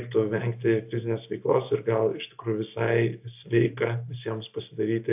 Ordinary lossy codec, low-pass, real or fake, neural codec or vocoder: AAC, 32 kbps; 3.6 kHz; real; none